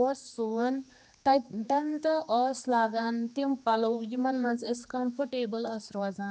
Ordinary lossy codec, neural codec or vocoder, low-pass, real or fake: none; codec, 16 kHz, 2 kbps, X-Codec, HuBERT features, trained on general audio; none; fake